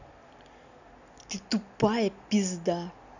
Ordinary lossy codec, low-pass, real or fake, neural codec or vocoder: none; 7.2 kHz; real; none